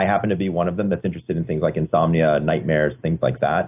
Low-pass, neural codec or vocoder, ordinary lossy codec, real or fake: 3.6 kHz; none; AAC, 32 kbps; real